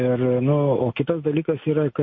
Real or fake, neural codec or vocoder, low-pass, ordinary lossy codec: real; none; 7.2 kHz; MP3, 32 kbps